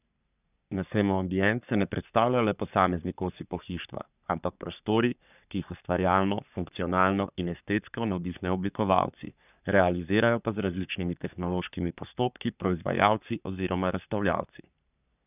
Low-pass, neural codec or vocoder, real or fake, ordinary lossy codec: 3.6 kHz; codec, 44.1 kHz, 3.4 kbps, Pupu-Codec; fake; none